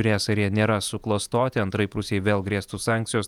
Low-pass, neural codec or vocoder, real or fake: 19.8 kHz; vocoder, 48 kHz, 128 mel bands, Vocos; fake